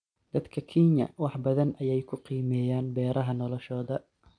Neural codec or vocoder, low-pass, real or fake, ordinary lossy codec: none; 9.9 kHz; real; none